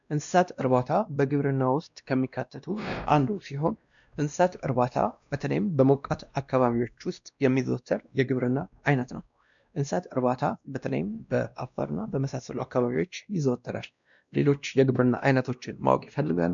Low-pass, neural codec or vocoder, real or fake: 7.2 kHz; codec, 16 kHz, 1 kbps, X-Codec, WavLM features, trained on Multilingual LibriSpeech; fake